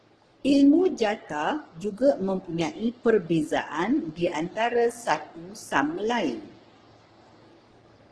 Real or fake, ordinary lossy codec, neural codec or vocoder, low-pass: fake; Opus, 16 kbps; codec, 44.1 kHz, 3.4 kbps, Pupu-Codec; 10.8 kHz